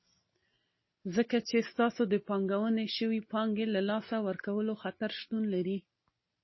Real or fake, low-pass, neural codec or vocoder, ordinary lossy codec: real; 7.2 kHz; none; MP3, 24 kbps